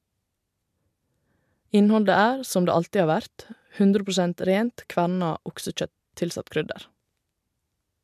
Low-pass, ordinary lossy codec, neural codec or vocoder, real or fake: 14.4 kHz; MP3, 96 kbps; none; real